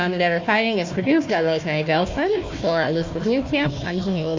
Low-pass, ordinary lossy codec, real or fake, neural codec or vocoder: 7.2 kHz; MP3, 48 kbps; fake; codec, 16 kHz, 1 kbps, FunCodec, trained on Chinese and English, 50 frames a second